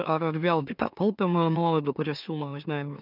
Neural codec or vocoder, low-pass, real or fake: autoencoder, 44.1 kHz, a latent of 192 numbers a frame, MeloTTS; 5.4 kHz; fake